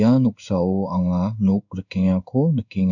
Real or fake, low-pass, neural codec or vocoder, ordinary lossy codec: fake; 7.2 kHz; codec, 16 kHz in and 24 kHz out, 1 kbps, XY-Tokenizer; none